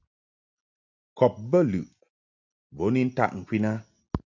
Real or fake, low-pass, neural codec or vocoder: real; 7.2 kHz; none